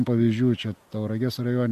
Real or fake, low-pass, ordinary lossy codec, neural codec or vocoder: real; 14.4 kHz; MP3, 64 kbps; none